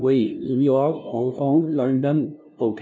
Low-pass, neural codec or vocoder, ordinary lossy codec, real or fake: none; codec, 16 kHz, 0.5 kbps, FunCodec, trained on LibriTTS, 25 frames a second; none; fake